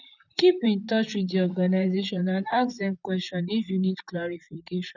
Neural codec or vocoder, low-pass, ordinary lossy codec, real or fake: vocoder, 44.1 kHz, 128 mel bands, Pupu-Vocoder; 7.2 kHz; none; fake